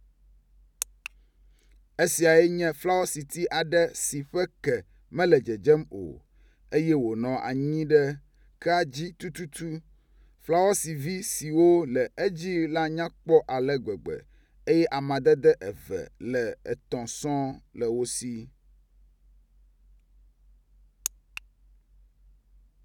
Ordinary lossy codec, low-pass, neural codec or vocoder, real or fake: none; 19.8 kHz; none; real